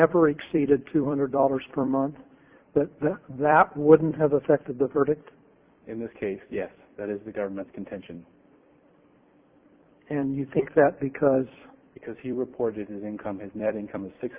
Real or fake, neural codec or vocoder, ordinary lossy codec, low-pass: fake; vocoder, 44.1 kHz, 128 mel bands every 256 samples, BigVGAN v2; Opus, 64 kbps; 3.6 kHz